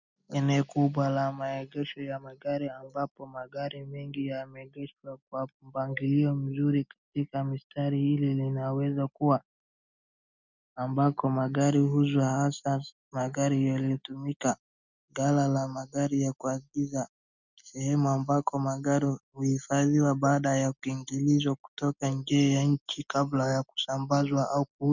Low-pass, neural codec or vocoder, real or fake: 7.2 kHz; none; real